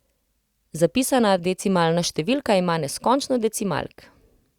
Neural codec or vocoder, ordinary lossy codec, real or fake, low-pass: none; Opus, 64 kbps; real; 19.8 kHz